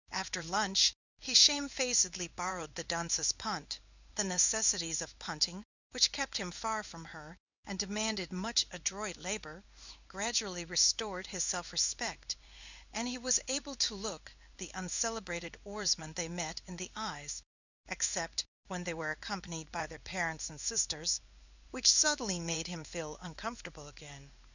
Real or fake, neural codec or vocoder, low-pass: fake; codec, 16 kHz in and 24 kHz out, 1 kbps, XY-Tokenizer; 7.2 kHz